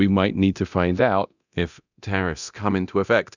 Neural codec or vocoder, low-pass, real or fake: codec, 24 kHz, 0.9 kbps, DualCodec; 7.2 kHz; fake